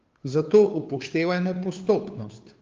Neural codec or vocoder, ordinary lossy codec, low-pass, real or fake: codec, 16 kHz, 2 kbps, FunCodec, trained on Chinese and English, 25 frames a second; Opus, 32 kbps; 7.2 kHz; fake